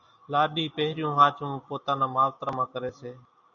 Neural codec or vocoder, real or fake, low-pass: none; real; 7.2 kHz